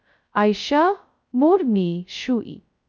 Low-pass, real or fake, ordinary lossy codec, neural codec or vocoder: none; fake; none; codec, 16 kHz, 0.2 kbps, FocalCodec